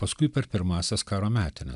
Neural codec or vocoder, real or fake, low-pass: none; real; 10.8 kHz